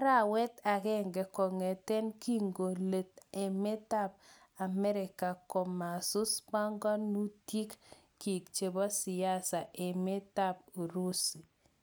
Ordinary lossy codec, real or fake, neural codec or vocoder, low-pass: none; real; none; none